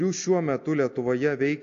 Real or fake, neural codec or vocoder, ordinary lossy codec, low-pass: real; none; AAC, 48 kbps; 7.2 kHz